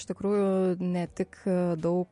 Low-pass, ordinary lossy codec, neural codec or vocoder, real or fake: 14.4 kHz; MP3, 48 kbps; none; real